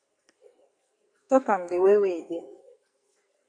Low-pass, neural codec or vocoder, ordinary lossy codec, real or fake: 9.9 kHz; codec, 44.1 kHz, 2.6 kbps, SNAC; AAC, 64 kbps; fake